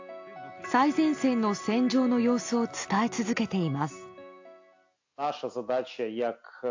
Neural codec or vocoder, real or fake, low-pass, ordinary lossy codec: none; real; 7.2 kHz; AAC, 48 kbps